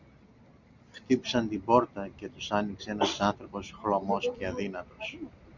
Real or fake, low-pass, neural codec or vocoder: real; 7.2 kHz; none